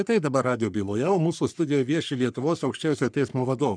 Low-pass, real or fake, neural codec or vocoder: 9.9 kHz; fake; codec, 44.1 kHz, 3.4 kbps, Pupu-Codec